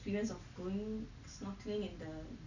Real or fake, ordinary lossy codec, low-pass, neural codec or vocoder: real; none; 7.2 kHz; none